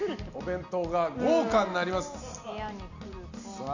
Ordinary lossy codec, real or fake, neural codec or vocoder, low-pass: none; real; none; 7.2 kHz